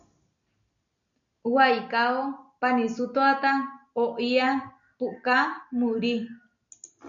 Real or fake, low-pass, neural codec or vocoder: real; 7.2 kHz; none